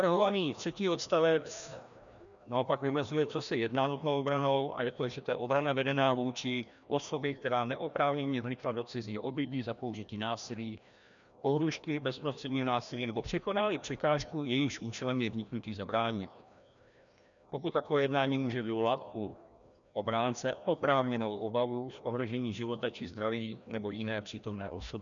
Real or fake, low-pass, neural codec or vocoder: fake; 7.2 kHz; codec, 16 kHz, 1 kbps, FreqCodec, larger model